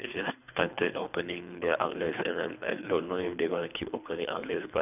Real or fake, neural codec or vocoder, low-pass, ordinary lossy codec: fake; codec, 24 kHz, 3 kbps, HILCodec; 3.6 kHz; none